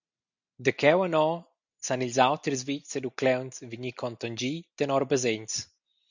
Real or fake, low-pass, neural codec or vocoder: real; 7.2 kHz; none